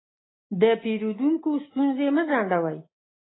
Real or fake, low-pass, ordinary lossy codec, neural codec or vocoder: real; 7.2 kHz; AAC, 16 kbps; none